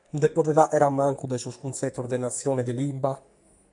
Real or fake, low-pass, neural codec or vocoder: fake; 10.8 kHz; codec, 44.1 kHz, 2.6 kbps, SNAC